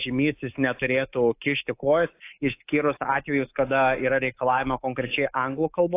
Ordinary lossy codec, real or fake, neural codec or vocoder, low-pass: AAC, 24 kbps; fake; codec, 24 kHz, 3.1 kbps, DualCodec; 3.6 kHz